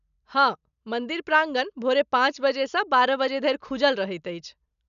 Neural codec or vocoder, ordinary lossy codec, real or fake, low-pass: none; none; real; 7.2 kHz